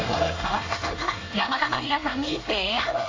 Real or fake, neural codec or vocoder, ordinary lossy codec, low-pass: fake; codec, 24 kHz, 1 kbps, SNAC; AAC, 32 kbps; 7.2 kHz